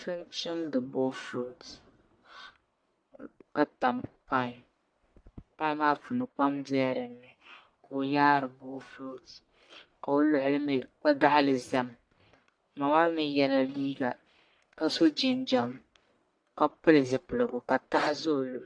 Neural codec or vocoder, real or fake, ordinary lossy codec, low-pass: codec, 44.1 kHz, 1.7 kbps, Pupu-Codec; fake; AAC, 64 kbps; 9.9 kHz